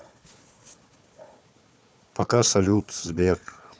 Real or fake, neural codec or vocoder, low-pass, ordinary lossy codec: fake; codec, 16 kHz, 4 kbps, FunCodec, trained on Chinese and English, 50 frames a second; none; none